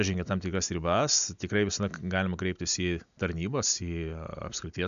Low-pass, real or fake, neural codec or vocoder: 7.2 kHz; real; none